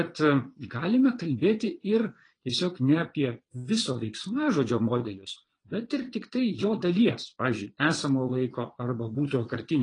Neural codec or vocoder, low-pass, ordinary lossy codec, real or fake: vocoder, 22.05 kHz, 80 mel bands, Vocos; 9.9 kHz; AAC, 32 kbps; fake